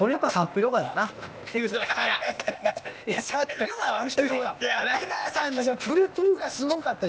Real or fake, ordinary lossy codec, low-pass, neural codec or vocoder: fake; none; none; codec, 16 kHz, 0.8 kbps, ZipCodec